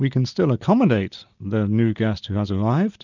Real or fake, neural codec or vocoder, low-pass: real; none; 7.2 kHz